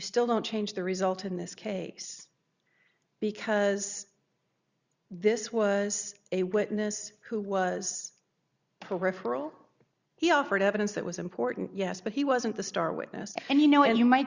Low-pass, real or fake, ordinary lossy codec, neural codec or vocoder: 7.2 kHz; real; Opus, 64 kbps; none